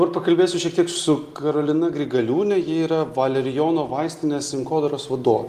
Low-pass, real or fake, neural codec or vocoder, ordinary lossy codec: 14.4 kHz; real; none; Opus, 24 kbps